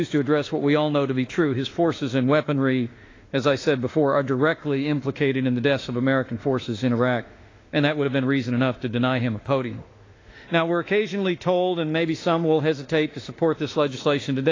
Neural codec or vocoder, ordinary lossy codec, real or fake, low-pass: autoencoder, 48 kHz, 32 numbers a frame, DAC-VAE, trained on Japanese speech; AAC, 32 kbps; fake; 7.2 kHz